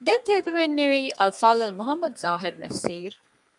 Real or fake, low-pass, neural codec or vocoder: fake; 10.8 kHz; codec, 32 kHz, 1.9 kbps, SNAC